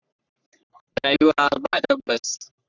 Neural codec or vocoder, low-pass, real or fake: codec, 44.1 kHz, 3.4 kbps, Pupu-Codec; 7.2 kHz; fake